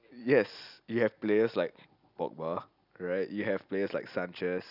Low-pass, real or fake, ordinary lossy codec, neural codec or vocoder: 5.4 kHz; real; none; none